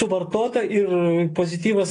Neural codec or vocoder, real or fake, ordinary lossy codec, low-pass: none; real; AAC, 32 kbps; 9.9 kHz